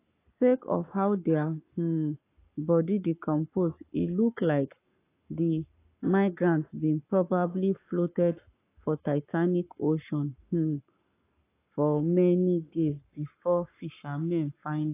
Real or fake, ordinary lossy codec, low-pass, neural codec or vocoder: fake; AAC, 24 kbps; 3.6 kHz; codec, 44.1 kHz, 7.8 kbps, Pupu-Codec